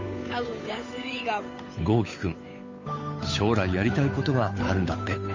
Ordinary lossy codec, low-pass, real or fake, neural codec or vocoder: MP3, 32 kbps; 7.2 kHz; fake; codec, 16 kHz, 8 kbps, FunCodec, trained on Chinese and English, 25 frames a second